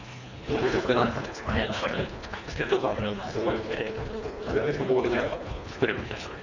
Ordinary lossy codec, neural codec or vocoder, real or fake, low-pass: none; codec, 24 kHz, 1.5 kbps, HILCodec; fake; 7.2 kHz